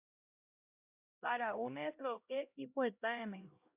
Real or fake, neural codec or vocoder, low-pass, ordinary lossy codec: fake; codec, 16 kHz, 0.5 kbps, X-Codec, HuBERT features, trained on LibriSpeech; 3.6 kHz; AAC, 32 kbps